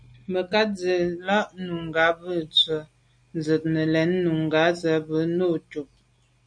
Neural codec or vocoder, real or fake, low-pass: none; real; 9.9 kHz